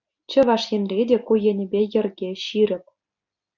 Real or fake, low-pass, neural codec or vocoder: real; 7.2 kHz; none